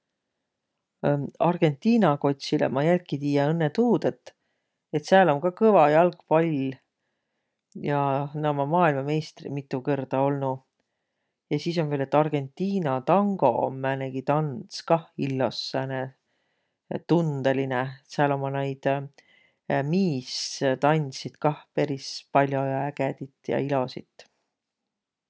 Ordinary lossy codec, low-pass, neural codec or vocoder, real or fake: none; none; none; real